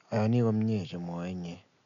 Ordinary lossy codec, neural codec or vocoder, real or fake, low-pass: none; none; real; 7.2 kHz